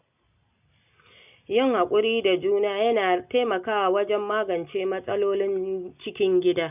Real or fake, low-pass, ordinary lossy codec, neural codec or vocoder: real; 3.6 kHz; none; none